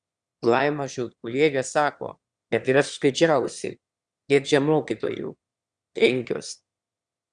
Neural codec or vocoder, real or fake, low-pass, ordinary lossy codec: autoencoder, 22.05 kHz, a latent of 192 numbers a frame, VITS, trained on one speaker; fake; 9.9 kHz; Opus, 64 kbps